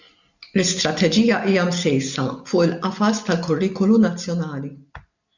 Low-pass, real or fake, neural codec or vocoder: 7.2 kHz; real; none